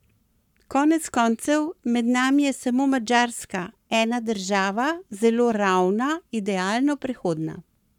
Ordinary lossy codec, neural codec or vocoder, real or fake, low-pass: none; codec, 44.1 kHz, 7.8 kbps, Pupu-Codec; fake; 19.8 kHz